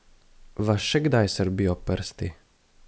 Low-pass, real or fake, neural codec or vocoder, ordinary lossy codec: none; real; none; none